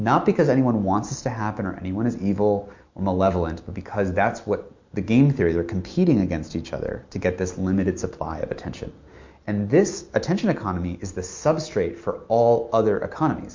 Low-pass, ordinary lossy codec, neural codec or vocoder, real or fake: 7.2 kHz; MP3, 48 kbps; none; real